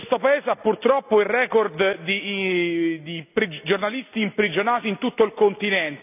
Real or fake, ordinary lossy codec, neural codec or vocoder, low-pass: real; none; none; 3.6 kHz